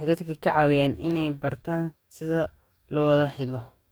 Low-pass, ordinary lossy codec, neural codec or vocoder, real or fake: none; none; codec, 44.1 kHz, 2.6 kbps, DAC; fake